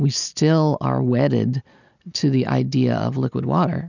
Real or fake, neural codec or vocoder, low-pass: real; none; 7.2 kHz